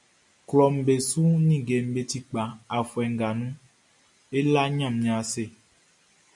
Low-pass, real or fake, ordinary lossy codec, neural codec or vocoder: 10.8 kHz; real; MP3, 96 kbps; none